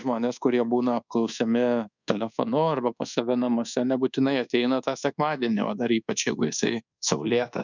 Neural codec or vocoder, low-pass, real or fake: codec, 24 kHz, 1.2 kbps, DualCodec; 7.2 kHz; fake